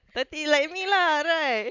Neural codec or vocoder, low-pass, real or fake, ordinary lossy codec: none; 7.2 kHz; real; none